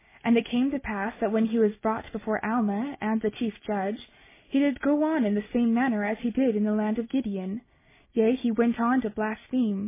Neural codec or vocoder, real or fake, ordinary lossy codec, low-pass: none; real; MP3, 16 kbps; 3.6 kHz